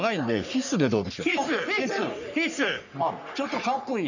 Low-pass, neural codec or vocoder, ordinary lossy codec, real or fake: 7.2 kHz; codec, 44.1 kHz, 3.4 kbps, Pupu-Codec; none; fake